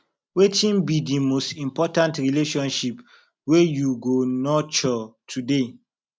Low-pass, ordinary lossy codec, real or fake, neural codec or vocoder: none; none; real; none